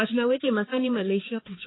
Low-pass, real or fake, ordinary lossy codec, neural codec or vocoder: 7.2 kHz; fake; AAC, 16 kbps; codec, 16 kHz, 2 kbps, X-Codec, HuBERT features, trained on balanced general audio